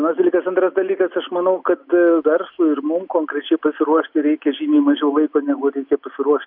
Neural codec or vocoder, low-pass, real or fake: none; 5.4 kHz; real